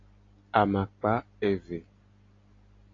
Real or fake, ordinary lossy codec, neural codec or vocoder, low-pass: real; AAC, 32 kbps; none; 7.2 kHz